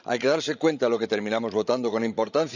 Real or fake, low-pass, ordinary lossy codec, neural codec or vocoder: fake; 7.2 kHz; none; codec, 16 kHz, 16 kbps, FreqCodec, larger model